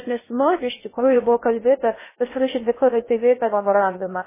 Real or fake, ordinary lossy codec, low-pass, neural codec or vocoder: fake; MP3, 16 kbps; 3.6 kHz; codec, 16 kHz in and 24 kHz out, 0.6 kbps, FocalCodec, streaming, 2048 codes